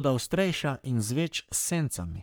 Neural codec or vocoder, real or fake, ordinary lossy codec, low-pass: codec, 44.1 kHz, 3.4 kbps, Pupu-Codec; fake; none; none